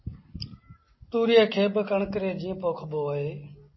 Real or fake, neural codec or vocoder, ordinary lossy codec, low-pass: real; none; MP3, 24 kbps; 7.2 kHz